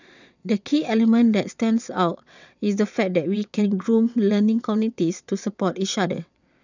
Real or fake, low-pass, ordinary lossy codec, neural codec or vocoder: fake; 7.2 kHz; none; vocoder, 22.05 kHz, 80 mel bands, WaveNeXt